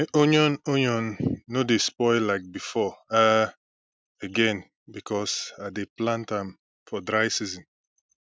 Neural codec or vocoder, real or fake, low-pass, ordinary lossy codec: none; real; none; none